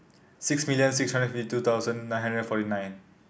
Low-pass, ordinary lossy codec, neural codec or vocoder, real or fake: none; none; none; real